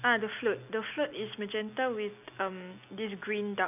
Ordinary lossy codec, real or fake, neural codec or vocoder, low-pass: none; real; none; 3.6 kHz